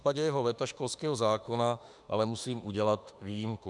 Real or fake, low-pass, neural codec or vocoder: fake; 10.8 kHz; autoencoder, 48 kHz, 32 numbers a frame, DAC-VAE, trained on Japanese speech